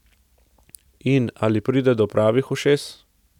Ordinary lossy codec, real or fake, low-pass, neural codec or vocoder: none; real; 19.8 kHz; none